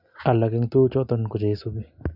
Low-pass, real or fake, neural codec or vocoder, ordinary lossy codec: 5.4 kHz; real; none; none